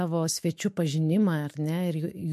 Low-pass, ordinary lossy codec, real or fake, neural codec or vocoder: 14.4 kHz; MP3, 64 kbps; fake; autoencoder, 48 kHz, 128 numbers a frame, DAC-VAE, trained on Japanese speech